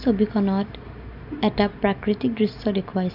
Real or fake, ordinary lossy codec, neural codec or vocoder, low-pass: real; none; none; 5.4 kHz